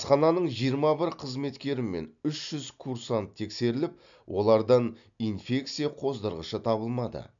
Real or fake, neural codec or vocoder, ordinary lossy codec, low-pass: real; none; none; 7.2 kHz